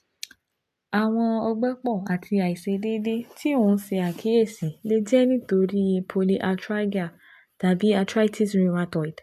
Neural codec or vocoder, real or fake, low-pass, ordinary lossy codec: none; real; 14.4 kHz; AAC, 96 kbps